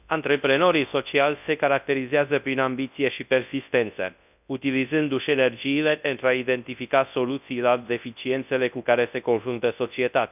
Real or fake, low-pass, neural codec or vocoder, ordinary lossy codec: fake; 3.6 kHz; codec, 24 kHz, 0.9 kbps, WavTokenizer, large speech release; none